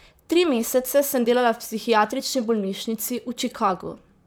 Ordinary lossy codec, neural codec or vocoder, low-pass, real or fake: none; vocoder, 44.1 kHz, 128 mel bands, Pupu-Vocoder; none; fake